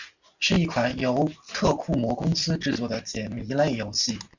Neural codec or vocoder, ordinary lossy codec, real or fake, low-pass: none; Opus, 64 kbps; real; 7.2 kHz